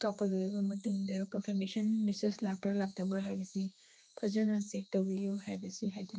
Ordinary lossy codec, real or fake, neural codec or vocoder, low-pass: none; fake; codec, 16 kHz, 2 kbps, X-Codec, HuBERT features, trained on general audio; none